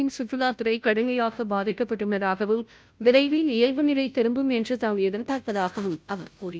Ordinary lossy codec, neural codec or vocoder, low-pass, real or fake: none; codec, 16 kHz, 0.5 kbps, FunCodec, trained on Chinese and English, 25 frames a second; none; fake